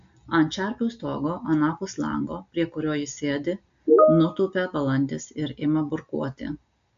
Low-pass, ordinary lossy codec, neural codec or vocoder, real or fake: 7.2 kHz; AAC, 64 kbps; none; real